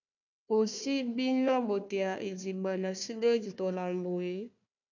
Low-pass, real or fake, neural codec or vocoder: 7.2 kHz; fake; codec, 16 kHz, 1 kbps, FunCodec, trained on Chinese and English, 50 frames a second